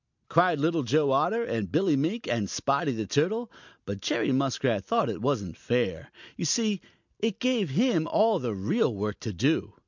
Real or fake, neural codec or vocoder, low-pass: real; none; 7.2 kHz